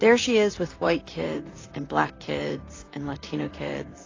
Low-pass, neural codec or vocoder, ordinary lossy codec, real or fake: 7.2 kHz; vocoder, 44.1 kHz, 128 mel bands, Pupu-Vocoder; AAC, 32 kbps; fake